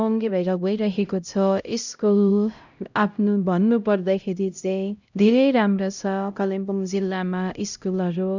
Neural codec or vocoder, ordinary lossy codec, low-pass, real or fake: codec, 16 kHz, 0.5 kbps, X-Codec, HuBERT features, trained on LibriSpeech; none; 7.2 kHz; fake